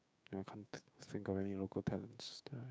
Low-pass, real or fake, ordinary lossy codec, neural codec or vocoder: none; fake; none; codec, 16 kHz, 6 kbps, DAC